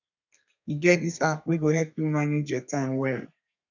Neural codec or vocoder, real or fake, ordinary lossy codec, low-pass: codec, 32 kHz, 1.9 kbps, SNAC; fake; none; 7.2 kHz